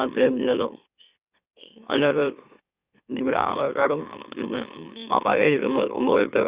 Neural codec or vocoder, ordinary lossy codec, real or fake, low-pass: autoencoder, 44.1 kHz, a latent of 192 numbers a frame, MeloTTS; Opus, 64 kbps; fake; 3.6 kHz